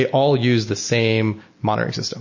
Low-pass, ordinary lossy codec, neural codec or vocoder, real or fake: 7.2 kHz; MP3, 32 kbps; none; real